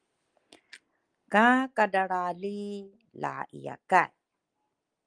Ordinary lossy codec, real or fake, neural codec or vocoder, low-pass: Opus, 32 kbps; real; none; 9.9 kHz